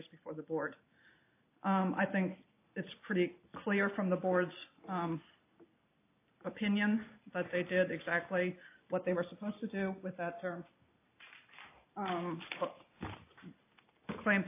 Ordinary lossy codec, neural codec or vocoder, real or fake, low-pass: AAC, 24 kbps; none; real; 3.6 kHz